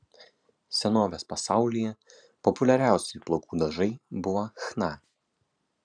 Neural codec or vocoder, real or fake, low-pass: vocoder, 44.1 kHz, 128 mel bands every 512 samples, BigVGAN v2; fake; 9.9 kHz